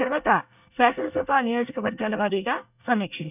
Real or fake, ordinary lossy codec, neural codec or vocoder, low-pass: fake; none; codec, 24 kHz, 1 kbps, SNAC; 3.6 kHz